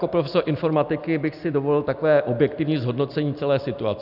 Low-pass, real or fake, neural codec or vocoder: 5.4 kHz; real; none